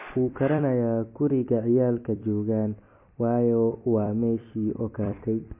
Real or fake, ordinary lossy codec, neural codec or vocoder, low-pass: fake; MP3, 24 kbps; vocoder, 44.1 kHz, 128 mel bands every 256 samples, BigVGAN v2; 3.6 kHz